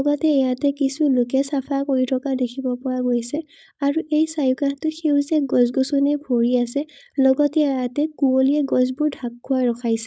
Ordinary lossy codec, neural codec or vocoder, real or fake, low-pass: none; codec, 16 kHz, 4.8 kbps, FACodec; fake; none